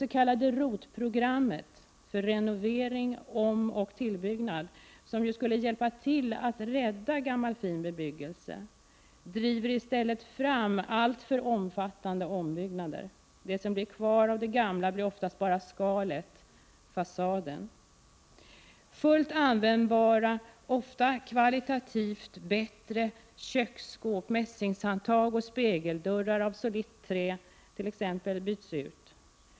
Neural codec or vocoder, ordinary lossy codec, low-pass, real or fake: none; none; none; real